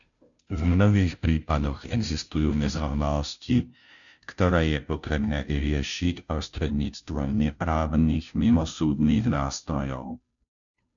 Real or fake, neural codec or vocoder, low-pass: fake; codec, 16 kHz, 0.5 kbps, FunCodec, trained on Chinese and English, 25 frames a second; 7.2 kHz